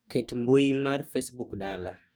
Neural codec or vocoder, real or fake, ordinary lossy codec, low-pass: codec, 44.1 kHz, 2.6 kbps, DAC; fake; none; none